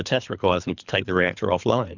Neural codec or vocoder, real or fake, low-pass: codec, 24 kHz, 3 kbps, HILCodec; fake; 7.2 kHz